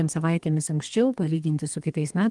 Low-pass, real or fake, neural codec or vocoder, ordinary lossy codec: 10.8 kHz; fake; codec, 32 kHz, 1.9 kbps, SNAC; Opus, 24 kbps